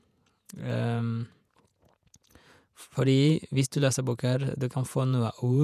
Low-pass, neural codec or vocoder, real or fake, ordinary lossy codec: 14.4 kHz; vocoder, 48 kHz, 128 mel bands, Vocos; fake; none